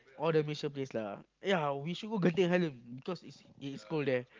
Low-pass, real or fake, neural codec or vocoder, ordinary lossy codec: 7.2 kHz; real; none; Opus, 32 kbps